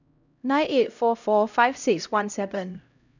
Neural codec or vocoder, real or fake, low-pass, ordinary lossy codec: codec, 16 kHz, 0.5 kbps, X-Codec, HuBERT features, trained on LibriSpeech; fake; 7.2 kHz; none